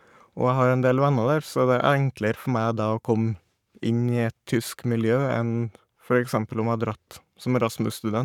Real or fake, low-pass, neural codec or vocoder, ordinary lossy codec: fake; 19.8 kHz; codec, 44.1 kHz, 7.8 kbps, Pupu-Codec; none